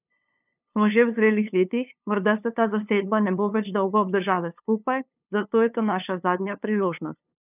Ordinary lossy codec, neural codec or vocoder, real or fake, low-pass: none; codec, 16 kHz, 2 kbps, FunCodec, trained on LibriTTS, 25 frames a second; fake; 3.6 kHz